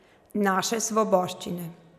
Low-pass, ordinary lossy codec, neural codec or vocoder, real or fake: 14.4 kHz; none; none; real